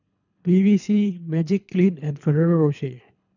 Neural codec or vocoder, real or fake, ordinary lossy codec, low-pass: codec, 24 kHz, 3 kbps, HILCodec; fake; none; 7.2 kHz